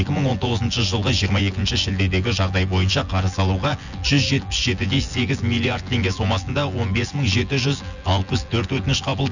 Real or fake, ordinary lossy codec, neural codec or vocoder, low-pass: fake; none; vocoder, 24 kHz, 100 mel bands, Vocos; 7.2 kHz